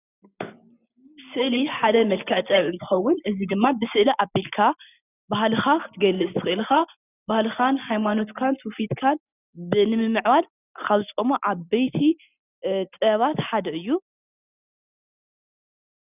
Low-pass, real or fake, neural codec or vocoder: 3.6 kHz; real; none